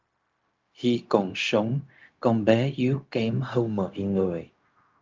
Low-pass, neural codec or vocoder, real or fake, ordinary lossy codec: 7.2 kHz; codec, 16 kHz, 0.4 kbps, LongCat-Audio-Codec; fake; Opus, 32 kbps